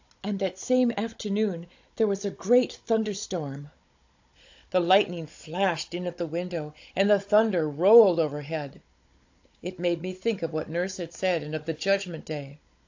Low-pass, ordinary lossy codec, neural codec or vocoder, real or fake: 7.2 kHz; AAC, 48 kbps; codec, 16 kHz, 16 kbps, FunCodec, trained on Chinese and English, 50 frames a second; fake